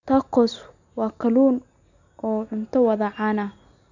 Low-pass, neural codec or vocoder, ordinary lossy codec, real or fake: 7.2 kHz; none; none; real